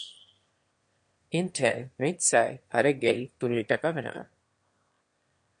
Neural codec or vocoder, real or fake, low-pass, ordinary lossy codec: autoencoder, 22.05 kHz, a latent of 192 numbers a frame, VITS, trained on one speaker; fake; 9.9 kHz; MP3, 48 kbps